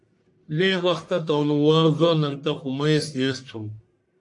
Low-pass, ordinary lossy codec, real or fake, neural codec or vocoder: 10.8 kHz; MP3, 64 kbps; fake; codec, 44.1 kHz, 1.7 kbps, Pupu-Codec